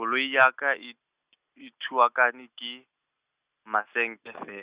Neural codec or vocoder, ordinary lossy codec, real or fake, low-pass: none; Opus, 64 kbps; real; 3.6 kHz